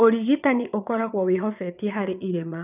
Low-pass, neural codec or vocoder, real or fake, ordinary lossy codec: 3.6 kHz; vocoder, 22.05 kHz, 80 mel bands, WaveNeXt; fake; none